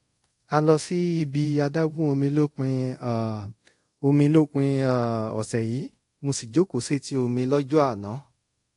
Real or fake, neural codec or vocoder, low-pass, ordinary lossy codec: fake; codec, 24 kHz, 0.5 kbps, DualCodec; 10.8 kHz; AAC, 48 kbps